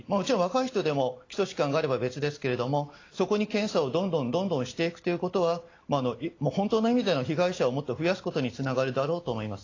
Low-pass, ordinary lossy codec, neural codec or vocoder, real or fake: 7.2 kHz; AAC, 32 kbps; none; real